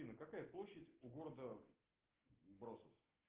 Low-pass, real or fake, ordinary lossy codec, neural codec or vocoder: 3.6 kHz; real; Opus, 16 kbps; none